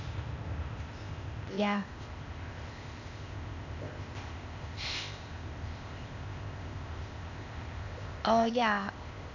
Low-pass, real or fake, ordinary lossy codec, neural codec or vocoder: 7.2 kHz; fake; none; codec, 16 kHz, 0.8 kbps, ZipCodec